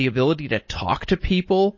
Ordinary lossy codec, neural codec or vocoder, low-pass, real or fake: MP3, 32 kbps; none; 7.2 kHz; real